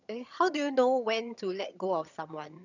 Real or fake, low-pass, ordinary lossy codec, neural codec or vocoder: fake; 7.2 kHz; none; vocoder, 22.05 kHz, 80 mel bands, HiFi-GAN